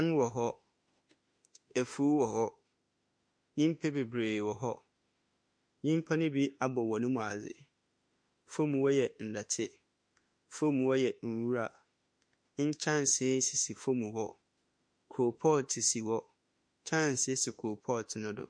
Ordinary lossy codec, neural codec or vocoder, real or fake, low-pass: MP3, 48 kbps; autoencoder, 48 kHz, 32 numbers a frame, DAC-VAE, trained on Japanese speech; fake; 9.9 kHz